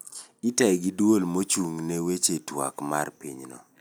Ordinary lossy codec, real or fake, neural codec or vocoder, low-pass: none; real; none; none